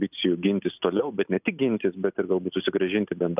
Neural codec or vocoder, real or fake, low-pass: none; real; 3.6 kHz